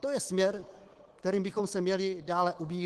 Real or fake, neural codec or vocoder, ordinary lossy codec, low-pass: fake; codec, 24 kHz, 3.1 kbps, DualCodec; Opus, 16 kbps; 10.8 kHz